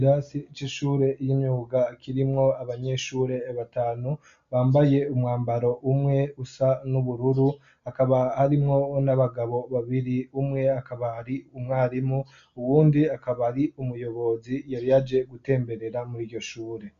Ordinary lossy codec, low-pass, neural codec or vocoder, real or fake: MP3, 64 kbps; 7.2 kHz; none; real